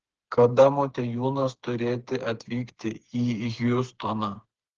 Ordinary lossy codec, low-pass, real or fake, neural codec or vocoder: Opus, 16 kbps; 7.2 kHz; fake; codec, 16 kHz, 4 kbps, FreqCodec, smaller model